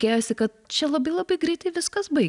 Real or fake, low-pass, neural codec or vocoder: real; 10.8 kHz; none